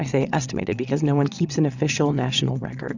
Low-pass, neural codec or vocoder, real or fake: 7.2 kHz; vocoder, 22.05 kHz, 80 mel bands, Vocos; fake